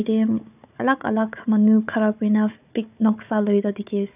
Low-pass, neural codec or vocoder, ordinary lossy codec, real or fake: 3.6 kHz; codec, 16 kHz, 4 kbps, FunCodec, trained on Chinese and English, 50 frames a second; none; fake